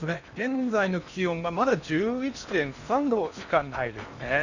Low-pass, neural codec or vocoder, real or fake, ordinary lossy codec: 7.2 kHz; codec, 16 kHz in and 24 kHz out, 0.8 kbps, FocalCodec, streaming, 65536 codes; fake; none